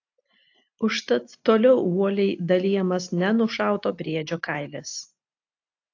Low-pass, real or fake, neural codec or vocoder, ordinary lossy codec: 7.2 kHz; real; none; AAC, 48 kbps